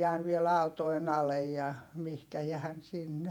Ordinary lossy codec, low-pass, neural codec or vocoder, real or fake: none; 19.8 kHz; vocoder, 48 kHz, 128 mel bands, Vocos; fake